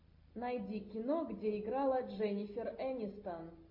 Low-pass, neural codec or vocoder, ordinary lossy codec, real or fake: 5.4 kHz; none; MP3, 32 kbps; real